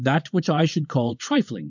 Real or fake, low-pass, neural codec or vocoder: fake; 7.2 kHz; vocoder, 22.05 kHz, 80 mel bands, WaveNeXt